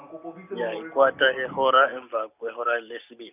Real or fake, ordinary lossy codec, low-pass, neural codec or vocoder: real; none; 3.6 kHz; none